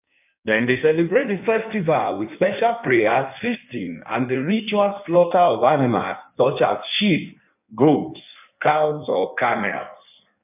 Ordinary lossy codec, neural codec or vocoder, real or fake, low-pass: none; codec, 16 kHz in and 24 kHz out, 1.1 kbps, FireRedTTS-2 codec; fake; 3.6 kHz